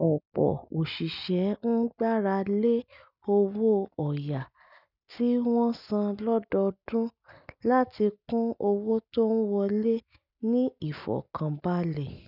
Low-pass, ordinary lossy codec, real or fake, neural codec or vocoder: 5.4 kHz; none; real; none